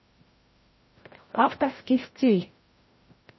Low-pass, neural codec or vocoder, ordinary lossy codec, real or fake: 7.2 kHz; codec, 16 kHz, 0.5 kbps, FreqCodec, larger model; MP3, 24 kbps; fake